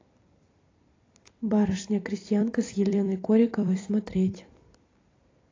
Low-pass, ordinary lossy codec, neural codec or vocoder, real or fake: 7.2 kHz; AAC, 32 kbps; vocoder, 44.1 kHz, 128 mel bands every 256 samples, BigVGAN v2; fake